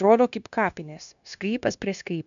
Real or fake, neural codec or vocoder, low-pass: fake; codec, 16 kHz, 0.9 kbps, LongCat-Audio-Codec; 7.2 kHz